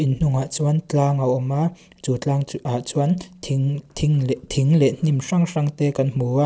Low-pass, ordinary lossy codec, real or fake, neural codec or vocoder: none; none; real; none